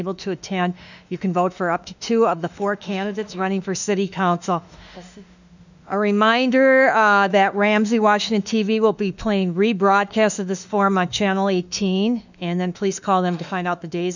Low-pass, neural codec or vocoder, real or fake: 7.2 kHz; autoencoder, 48 kHz, 32 numbers a frame, DAC-VAE, trained on Japanese speech; fake